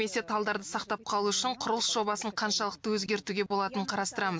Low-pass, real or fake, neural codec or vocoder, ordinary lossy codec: none; real; none; none